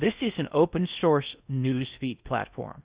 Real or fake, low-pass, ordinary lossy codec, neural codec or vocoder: fake; 3.6 kHz; Opus, 64 kbps; codec, 16 kHz in and 24 kHz out, 0.6 kbps, FocalCodec, streaming, 4096 codes